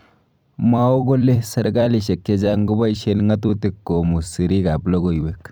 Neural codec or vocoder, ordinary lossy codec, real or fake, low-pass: vocoder, 44.1 kHz, 128 mel bands every 256 samples, BigVGAN v2; none; fake; none